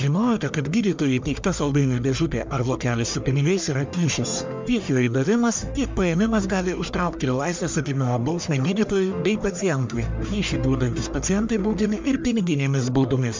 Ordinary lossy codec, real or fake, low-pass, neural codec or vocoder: MP3, 64 kbps; fake; 7.2 kHz; codec, 44.1 kHz, 1.7 kbps, Pupu-Codec